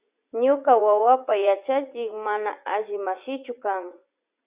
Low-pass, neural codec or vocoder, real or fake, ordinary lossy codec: 3.6 kHz; vocoder, 44.1 kHz, 80 mel bands, Vocos; fake; Opus, 64 kbps